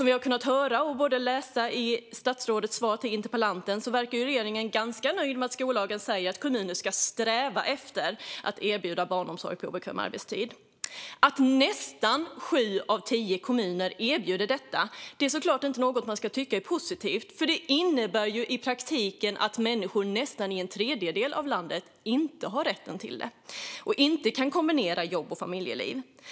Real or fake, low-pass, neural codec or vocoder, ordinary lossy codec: real; none; none; none